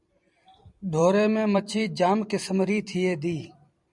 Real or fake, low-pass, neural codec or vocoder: fake; 10.8 kHz; vocoder, 44.1 kHz, 128 mel bands every 256 samples, BigVGAN v2